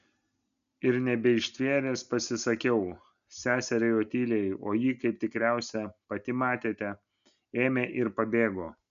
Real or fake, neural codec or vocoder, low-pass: real; none; 7.2 kHz